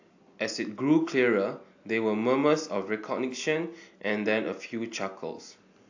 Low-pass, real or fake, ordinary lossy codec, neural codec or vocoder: 7.2 kHz; real; AAC, 48 kbps; none